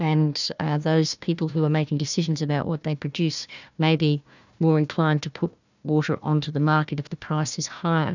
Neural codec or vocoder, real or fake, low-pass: codec, 16 kHz, 1 kbps, FunCodec, trained on Chinese and English, 50 frames a second; fake; 7.2 kHz